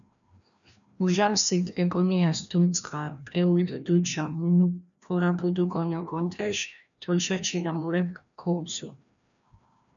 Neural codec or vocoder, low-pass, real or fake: codec, 16 kHz, 1 kbps, FreqCodec, larger model; 7.2 kHz; fake